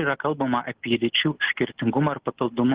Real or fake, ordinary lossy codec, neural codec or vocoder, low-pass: real; Opus, 16 kbps; none; 3.6 kHz